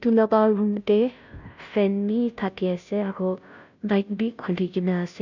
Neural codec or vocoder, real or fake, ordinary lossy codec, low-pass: codec, 16 kHz, 0.5 kbps, FunCodec, trained on Chinese and English, 25 frames a second; fake; none; 7.2 kHz